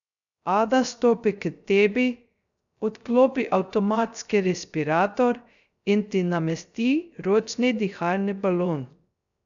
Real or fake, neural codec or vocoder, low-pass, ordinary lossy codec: fake; codec, 16 kHz, 0.3 kbps, FocalCodec; 7.2 kHz; none